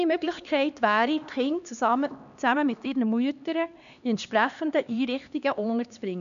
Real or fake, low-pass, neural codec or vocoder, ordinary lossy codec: fake; 7.2 kHz; codec, 16 kHz, 2 kbps, X-Codec, HuBERT features, trained on LibriSpeech; none